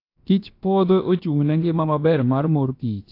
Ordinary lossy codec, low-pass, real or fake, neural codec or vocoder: AAC, 32 kbps; 5.4 kHz; fake; codec, 16 kHz, about 1 kbps, DyCAST, with the encoder's durations